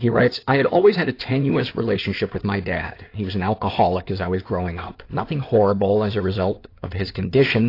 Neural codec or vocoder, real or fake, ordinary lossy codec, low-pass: codec, 16 kHz in and 24 kHz out, 2.2 kbps, FireRedTTS-2 codec; fake; AAC, 32 kbps; 5.4 kHz